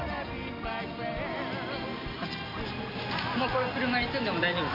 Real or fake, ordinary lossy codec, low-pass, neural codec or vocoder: real; MP3, 48 kbps; 5.4 kHz; none